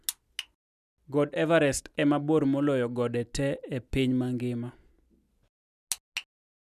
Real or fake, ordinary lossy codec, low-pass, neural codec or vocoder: real; none; 14.4 kHz; none